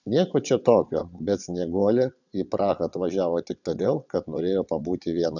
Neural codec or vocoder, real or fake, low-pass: vocoder, 22.05 kHz, 80 mel bands, WaveNeXt; fake; 7.2 kHz